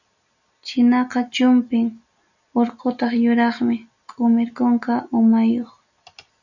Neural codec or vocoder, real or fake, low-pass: none; real; 7.2 kHz